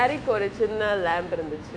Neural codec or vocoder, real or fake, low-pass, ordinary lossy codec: autoencoder, 48 kHz, 128 numbers a frame, DAC-VAE, trained on Japanese speech; fake; 9.9 kHz; none